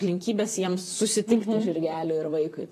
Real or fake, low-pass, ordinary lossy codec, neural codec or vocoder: fake; 14.4 kHz; AAC, 48 kbps; vocoder, 44.1 kHz, 128 mel bands, Pupu-Vocoder